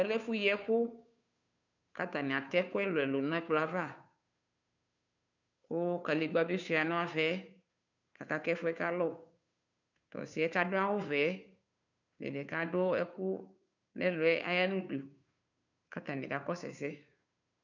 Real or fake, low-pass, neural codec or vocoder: fake; 7.2 kHz; codec, 16 kHz, 2 kbps, FunCodec, trained on Chinese and English, 25 frames a second